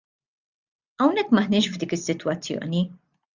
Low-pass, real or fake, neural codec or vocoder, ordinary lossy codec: 7.2 kHz; real; none; Opus, 64 kbps